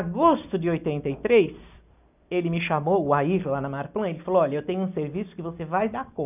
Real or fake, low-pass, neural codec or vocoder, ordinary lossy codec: real; 3.6 kHz; none; none